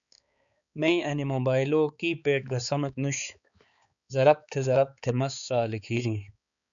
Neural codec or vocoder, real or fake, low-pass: codec, 16 kHz, 4 kbps, X-Codec, HuBERT features, trained on balanced general audio; fake; 7.2 kHz